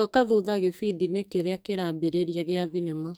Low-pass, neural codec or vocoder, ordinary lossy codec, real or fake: none; codec, 44.1 kHz, 2.6 kbps, SNAC; none; fake